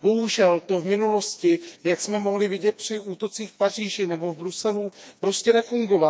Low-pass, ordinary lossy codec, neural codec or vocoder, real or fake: none; none; codec, 16 kHz, 2 kbps, FreqCodec, smaller model; fake